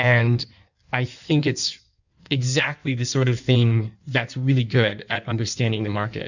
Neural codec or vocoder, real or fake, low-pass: codec, 16 kHz in and 24 kHz out, 1.1 kbps, FireRedTTS-2 codec; fake; 7.2 kHz